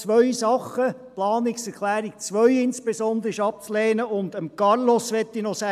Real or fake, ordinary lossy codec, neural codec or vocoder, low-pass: real; AAC, 96 kbps; none; 14.4 kHz